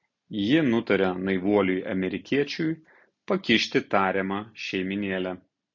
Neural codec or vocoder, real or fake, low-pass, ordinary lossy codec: none; real; 7.2 kHz; MP3, 48 kbps